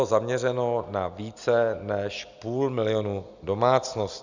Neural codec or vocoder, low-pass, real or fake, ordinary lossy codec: none; 7.2 kHz; real; Opus, 64 kbps